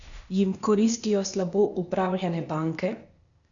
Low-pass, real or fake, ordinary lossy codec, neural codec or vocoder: 7.2 kHz; fake; none; codec, 16 kHz, 0.8 kbps, ZipCodec